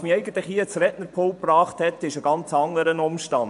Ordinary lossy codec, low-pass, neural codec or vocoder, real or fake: none; 10.8 kHz; vocoder, 24 kHz, 100 mel bands, Vocos; fake